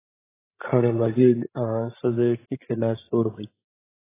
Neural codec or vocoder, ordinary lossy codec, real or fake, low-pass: codec, 16 kHz, 16 kbps, FreqCodec, larger model; AAC, 16 kbps; fake; 3.6 kHz